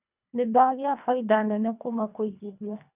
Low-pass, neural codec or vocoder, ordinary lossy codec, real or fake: 3.6 kHz; codec, 24 kHz, 1.5 kbps, HILCodec; none; fake